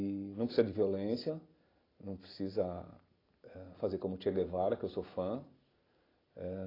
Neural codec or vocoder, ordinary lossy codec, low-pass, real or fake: none; AAC, 24 kbps; 5.4 kHz; real